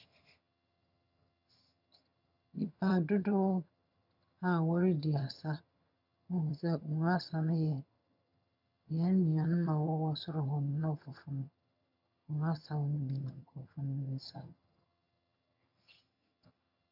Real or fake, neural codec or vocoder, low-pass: fake; vocoder, 22.05 kHz, 80 mel bands, HiFi-GAN; 5.4 kHz